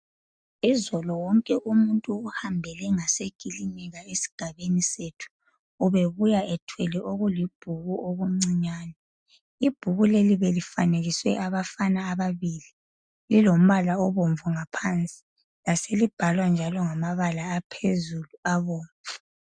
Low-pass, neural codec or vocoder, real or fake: 9.9 kHz; none; real